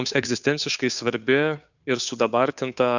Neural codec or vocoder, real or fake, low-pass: codec, 16 kHz, 2 kbps, FunCodec, trained on Chinese and English, 25 frames a second; fake; 7.2 kHz